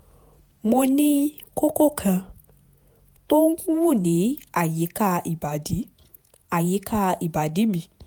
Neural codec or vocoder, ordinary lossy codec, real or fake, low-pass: vocoder, 48 kHz, 128 mel bands, Vocos; none; fake; none